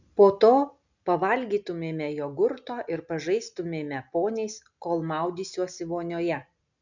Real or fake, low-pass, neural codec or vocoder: real; 7.2 kHz; none